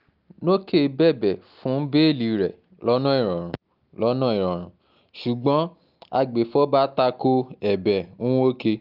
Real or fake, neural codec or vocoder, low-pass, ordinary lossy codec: real; none; 5.4 kHz; Opus, 24 kbps